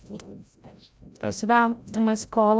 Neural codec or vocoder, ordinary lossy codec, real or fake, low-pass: codec, 16 kHz, 0.5 kbps, FreqCodec, larger model; none; fake; none